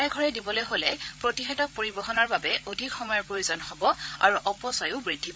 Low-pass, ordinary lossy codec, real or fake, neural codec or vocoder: none; none; fake; codec, 16 kHz, 8 kbps, FreqCodec, larger model